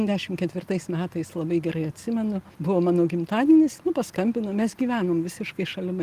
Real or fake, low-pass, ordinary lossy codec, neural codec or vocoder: real; 14.4 kHz; Opus, 24 kbps; none